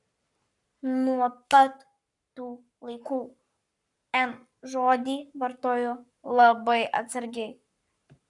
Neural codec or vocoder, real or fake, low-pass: codec, 44.1 kHz, 7.8 kbps, Pupu-Codec; fake; 10.8 kHz